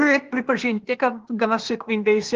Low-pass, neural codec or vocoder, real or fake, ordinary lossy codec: 7.2 kHz; codec, 16 kHz, 0.8 kbps, ZipCodec; fake; Opus, 16 kbps